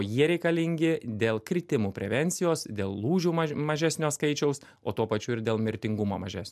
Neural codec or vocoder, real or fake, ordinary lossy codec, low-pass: none; real; MP3, 96 kbps; 14.4 kHz